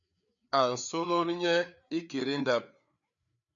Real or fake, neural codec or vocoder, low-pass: fake; codec, 16 kHz, 4 kbps, FreqCodec, larger model; 7.2 kHz